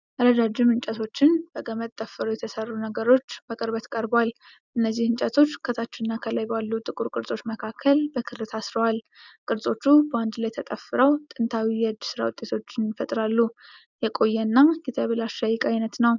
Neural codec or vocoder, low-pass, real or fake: none; 7.2 kHz; real